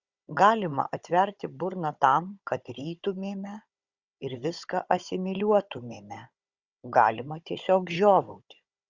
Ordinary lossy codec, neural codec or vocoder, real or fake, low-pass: Opus, 64 kbps; codec, 16 kHz, 16 kbps, FunCodec, trained on Chinese and English, 50 frames a second; fake; 7.2 kHz